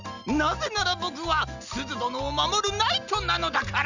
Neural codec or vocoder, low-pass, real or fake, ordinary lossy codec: none; 7.2 kHz; real; none